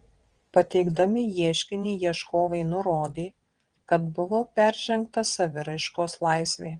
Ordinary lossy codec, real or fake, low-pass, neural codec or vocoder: Opus, 24 kbps; fake; 9.9 kHz; vocoder, 22.05 kHz, 80 mel bands, WaveNeXt